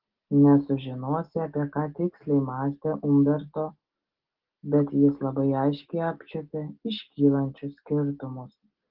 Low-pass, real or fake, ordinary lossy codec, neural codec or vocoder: 5.4 kHz; real; Opus, 16 kbps; none